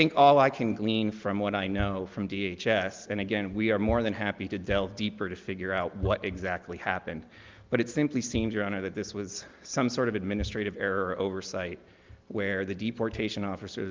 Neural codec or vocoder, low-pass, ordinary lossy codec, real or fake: none; 7.2 kHz; Opus, 32 kbps; real